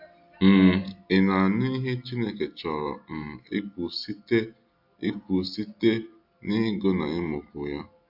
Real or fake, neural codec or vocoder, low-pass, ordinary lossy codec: real; none; 5.4 kHz; AAC, 48 kbps